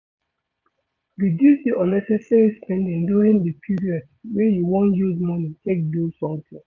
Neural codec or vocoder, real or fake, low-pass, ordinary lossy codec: vocoder, 22.05 kHz, 80 mel bands, Vocos; fake; 7.2 kHz; none